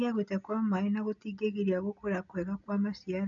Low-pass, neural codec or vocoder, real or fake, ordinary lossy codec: 7.2 kHz; none; real; none